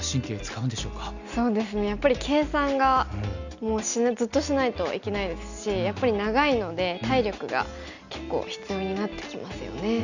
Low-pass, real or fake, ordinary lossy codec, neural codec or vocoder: 7.2 kHz; real; none; none